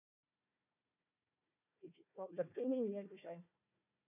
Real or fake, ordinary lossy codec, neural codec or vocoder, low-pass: fake; none; codec, 16 kHz, 1 kbps, FunCodec, trained on Chinese and English, 50 frames a second; 3.6 kHz